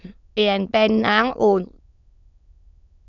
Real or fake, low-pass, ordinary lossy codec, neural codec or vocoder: fake; 7.2 kHz; none; autoencoder, 22.05 kHz, a latent of 192 numbers a frame, VITS, trained on many speakers